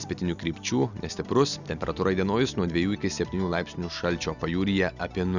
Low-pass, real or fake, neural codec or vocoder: 7.2 kHz; real; none